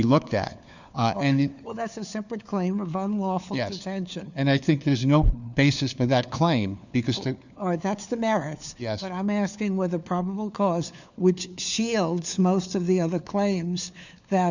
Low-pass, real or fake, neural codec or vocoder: 7.2 kHz; fake; codec, 16 kHz, 4 kbps, FunCodec, trained on LibriTTS, 50 frames a second